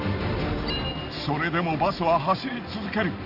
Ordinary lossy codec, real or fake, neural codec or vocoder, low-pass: none; real; none; 5.4 kHz